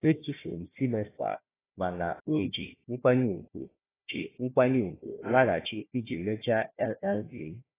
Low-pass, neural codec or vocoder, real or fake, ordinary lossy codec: 3.6 kHz; codec, 16 kHz, 1 kbps, FunCodec, trained on Chinese and English, 50 frames a second; fake; AAC, 16 kbps